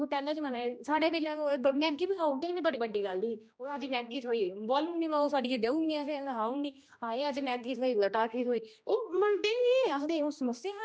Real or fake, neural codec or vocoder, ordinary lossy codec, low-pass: fake; codec, 16 kHz, 1 kbps, X-Codec, HuBERT features, trained on general audio; none; none